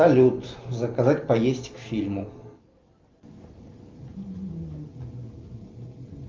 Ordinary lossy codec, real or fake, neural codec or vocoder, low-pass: Opus, 32 kbps; real; none; 7.2 kHz